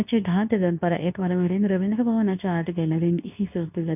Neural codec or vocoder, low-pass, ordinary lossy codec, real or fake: codec, 24 kHz, 0.9 kbps, WavTokenizer, medium speech release version 2; 3.6 kHz; none; fake